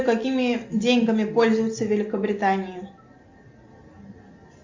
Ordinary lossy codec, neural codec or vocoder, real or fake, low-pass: MP3, 48 kbps; none; real; 7.2 kHz